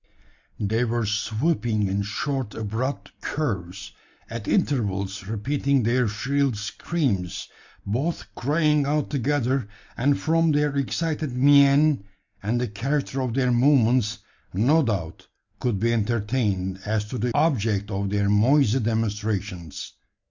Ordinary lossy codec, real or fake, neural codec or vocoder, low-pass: MP3, 64 kbps; real; none; 7.2 kHz